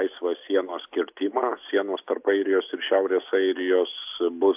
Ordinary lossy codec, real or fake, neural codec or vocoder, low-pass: AAC, 32 kbps; real; none; 3.6 kHz